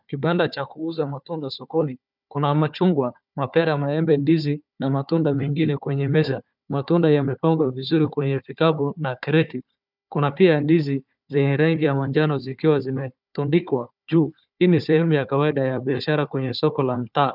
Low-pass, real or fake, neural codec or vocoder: 5.4 kHz; fake; codec, 16 kHz, 4 kbps, FunCodec, trained on Chinese and English, 50 frames a second